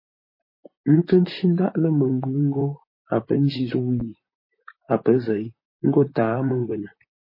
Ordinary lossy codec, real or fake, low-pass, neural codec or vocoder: MP3, 24 kbps; fake; 5.4 kHz; vocoder, 44.1 kHz, 128 mel bands, Pupu-Vocoder